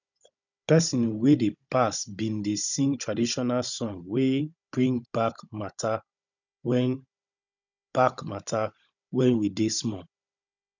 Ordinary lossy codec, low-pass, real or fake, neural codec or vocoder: none; 7.2 kHz; fake; codec, 16 kHz, 16 kbps, FunCodec, trained on Chinese and English, 50 frames a second